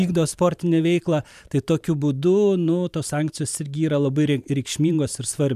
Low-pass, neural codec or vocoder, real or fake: 14.4 kHz; vocoder, 44.1 kHz, 128 mel bands every 512 samples, BigVGAN v2; fake